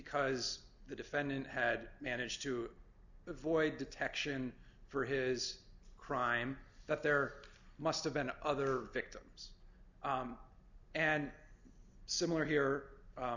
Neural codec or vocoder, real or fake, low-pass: none; real; 7.2 kHz